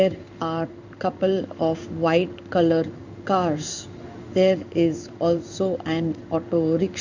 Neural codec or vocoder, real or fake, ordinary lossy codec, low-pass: codec, 16 kHz in and 24 kHz out, 1 kbps, XY-Tokenizer; fake; Opus, 64 kbps; 7.2 kHz